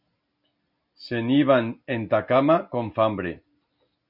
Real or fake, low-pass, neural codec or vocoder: real; 5.4 kHz; none